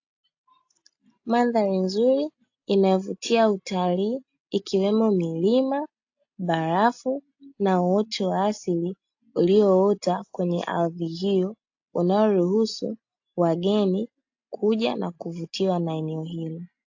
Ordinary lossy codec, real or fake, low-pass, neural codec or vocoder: AAC, 48 kbps; real; 7.2 kHz; none